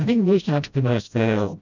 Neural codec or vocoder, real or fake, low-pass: codec, 16 kHz, 0.5 kbps, FreqCodec, smaller model; fake; 7.2 kHz